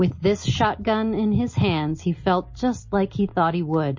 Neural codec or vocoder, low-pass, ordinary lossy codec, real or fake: none; 7.2 kHz; MP3, 32 kbps; real